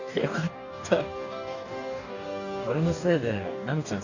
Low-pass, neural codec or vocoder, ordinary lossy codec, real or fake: 7.2 kHz; codec, 44.1 kHz, 2.6 kbps, DAC; none; fake